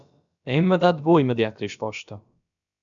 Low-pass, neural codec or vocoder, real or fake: 7.2 kHz; codec, 16 kHz, about 1 kbps, DyCAST, with the encoder's durations; fake